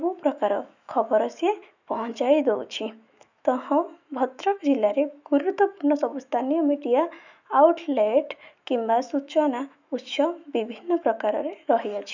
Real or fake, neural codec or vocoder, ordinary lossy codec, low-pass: fake; autoencoder, 48 kHz, 128 numbers a frame, DAC-VAE, trained on Japanese speech; none; 7.2 kHz